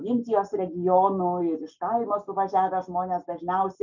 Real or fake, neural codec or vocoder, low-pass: real; none; 7.2 kHz